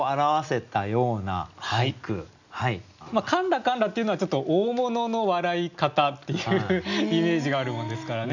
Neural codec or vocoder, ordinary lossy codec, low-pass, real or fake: none; none; 7.2 kHz; real